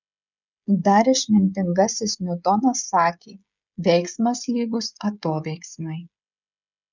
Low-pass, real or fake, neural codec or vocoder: 7.2 kHz; fake; codec, 16 kHz, 16 kbps, FreqCodec, smaller model